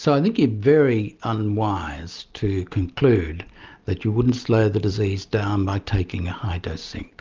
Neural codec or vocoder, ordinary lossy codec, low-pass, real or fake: none; Opus, 24 kbps; 7.2 kHz; real